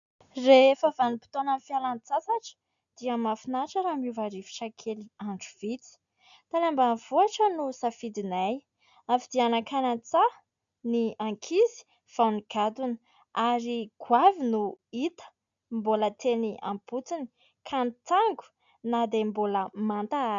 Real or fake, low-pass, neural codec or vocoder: real; 7.2 kHz; none